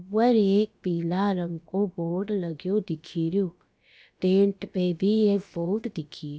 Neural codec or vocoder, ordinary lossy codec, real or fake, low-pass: codec, 16 kHz, about 1 kbps, DyCAST, with the encoder's durations; none; fake; none